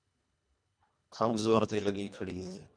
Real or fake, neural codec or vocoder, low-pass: fake; codec, 24 kHz, 1.5 kbps, HILCodec; 9.9 kHz